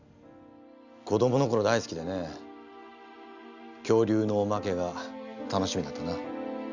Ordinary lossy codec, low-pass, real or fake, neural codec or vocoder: none; 7.2 kHz; real; none